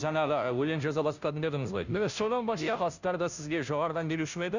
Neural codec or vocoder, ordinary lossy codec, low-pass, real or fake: codec, 16 kHz, 0.5 kbps, FunCodec, trained on Chinese and English, 25 frames a second; none; 7.2 kHz; fake